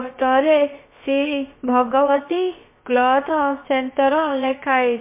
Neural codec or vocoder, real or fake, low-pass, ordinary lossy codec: codec, 16 kHz, about 1 kbps, DyCAST, with the encoder's durations; fake; 3.6 kHz; MP3, 24 kbps